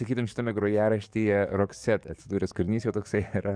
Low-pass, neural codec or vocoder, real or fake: 9.9 kHz; codec, 44.1 kHz, 7.8 kbps, DAC; fake